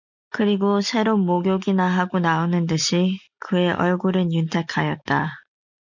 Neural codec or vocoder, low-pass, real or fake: none; 7.2 kHz; real